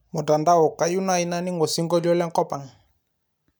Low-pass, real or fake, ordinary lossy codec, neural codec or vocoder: none; real; none; none